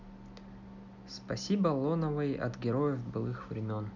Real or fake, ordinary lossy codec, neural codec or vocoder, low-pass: real; none; none; 7.2 kHz